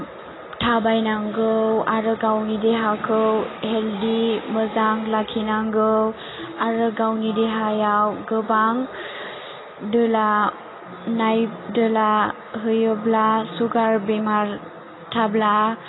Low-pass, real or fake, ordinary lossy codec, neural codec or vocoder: 7.2 kHz; real; AAC, 16 kbps; none